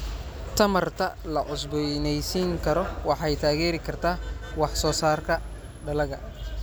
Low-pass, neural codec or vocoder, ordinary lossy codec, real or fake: none; none; none; real